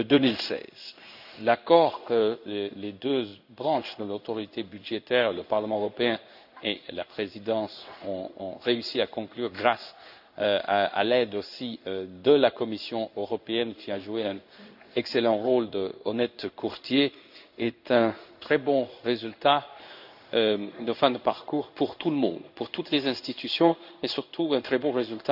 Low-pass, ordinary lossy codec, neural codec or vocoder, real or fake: 5.4 kHz; none; codec, 16 kHz in and 24 kHz out, 1 kbps, XY-Tokenizer; fake